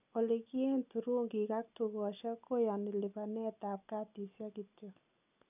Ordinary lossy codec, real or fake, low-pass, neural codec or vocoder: none; real; 3.6 kHz; none